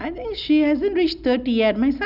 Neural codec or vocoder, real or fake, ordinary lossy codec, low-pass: none; real; none; 5.4 kHz